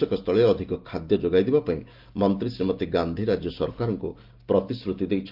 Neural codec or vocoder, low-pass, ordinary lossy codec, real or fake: none; 5.4 kHz; Opus, 32 kbps; real